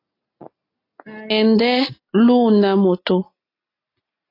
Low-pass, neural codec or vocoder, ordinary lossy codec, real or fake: 5.4 kHz; none; AAC, 32 kbps; real